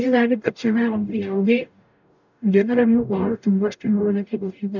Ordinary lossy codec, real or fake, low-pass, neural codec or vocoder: none; fake; 7.2 kHz; codec, 44.1 kHz, 0.9 kbps, DAC